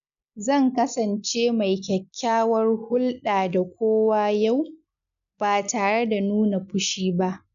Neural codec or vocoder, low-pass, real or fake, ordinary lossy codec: none; 7.2 kHz; real; none